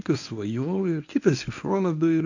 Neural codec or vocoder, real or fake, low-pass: codec, 24 kHz, 0.9 kbps, WavTokenizer, medium speech release version 1; fake; 7.2 kHz